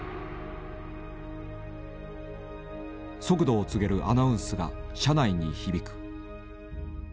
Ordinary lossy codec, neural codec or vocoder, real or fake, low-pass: none; none; real; none